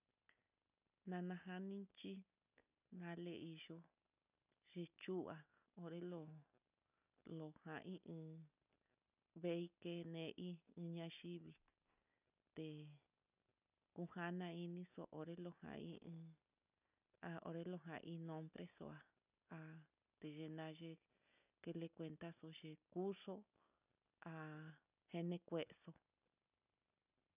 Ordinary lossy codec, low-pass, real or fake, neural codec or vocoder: none; 3.6 kHz; real; none